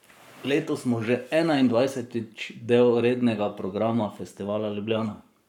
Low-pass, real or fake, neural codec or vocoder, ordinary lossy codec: 19.8 kHz; fake; codec, 44.1 kHz, 7.8 kbps, Pupu-Codec; none